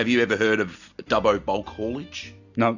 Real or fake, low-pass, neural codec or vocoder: real; 7.2 kHz; none